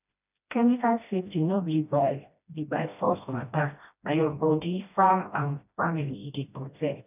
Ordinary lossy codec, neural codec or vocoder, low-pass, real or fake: none; codec, 16 kHz, 1 kbps, FreqCodec, smaller model; 3.6 kHz; fake